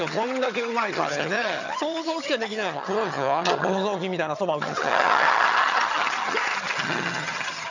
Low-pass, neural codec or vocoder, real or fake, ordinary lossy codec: 7.2 kHz; vocoder, 22.05 kHz, 80 mel bands, HiFi-GAN; fake; none